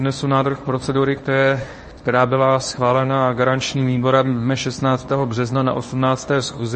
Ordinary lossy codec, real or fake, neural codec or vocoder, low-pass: MP3, 32 kbps; fake; codec, 24 kHz, 0.9 kbps, WavTokenizer, medium speech release version 1; 10.8 kHz